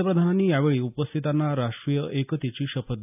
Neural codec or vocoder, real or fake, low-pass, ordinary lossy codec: none; real; 3.6 kHz; none